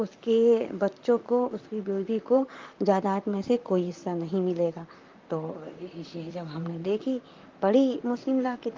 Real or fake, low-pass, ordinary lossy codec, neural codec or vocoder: fake; 7.2 kHz; Opus, 32 kbps; vocoder, 44.1 kHz, 128 mel bands, Pupu-Vocoder